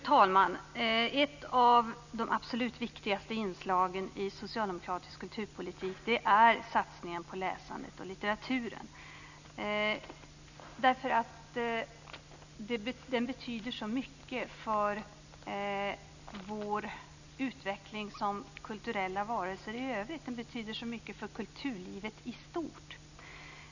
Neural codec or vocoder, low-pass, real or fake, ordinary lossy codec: none; 7.2 kHz; real; none